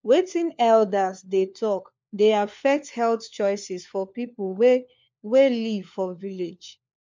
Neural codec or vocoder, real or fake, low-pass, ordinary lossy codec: codec, 16 kHz, 4 kbps, FunCodec, trained on LibriTTS, 50 frames a second; fake; 7.2 kHz; MP3, 64 kbps